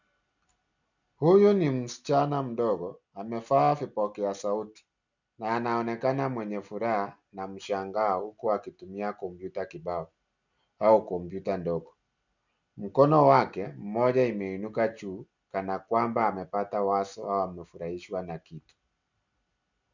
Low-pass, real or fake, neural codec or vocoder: 7.2 kHz; real; none